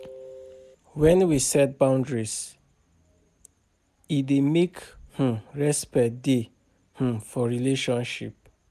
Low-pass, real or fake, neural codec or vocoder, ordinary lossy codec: 14.4 kHz; real; none; none